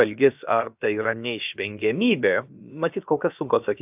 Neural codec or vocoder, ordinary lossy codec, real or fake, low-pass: codec, 16 kHz, about 1 kbps, DyCAST, with the encoder's durations; AAC, 32 kbps; fake; 3.6 kHz